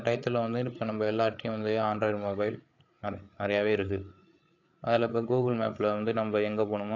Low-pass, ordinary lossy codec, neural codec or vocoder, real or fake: 7.2 kHz; AAC, 48 kbps; codec, 16 kHz, 8 kbps, FreqCodec, larger model; fake